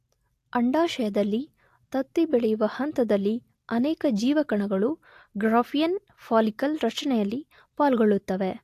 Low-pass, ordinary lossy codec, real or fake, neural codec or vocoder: 14.4 kHz; AAC, 64 kbps; real; none